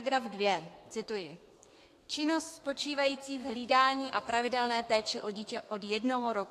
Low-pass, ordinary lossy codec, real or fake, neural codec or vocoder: 14.4 kHz; AAC, 64 kbps; fake; codec, 32 kHz, 1.9 kbps, SNAC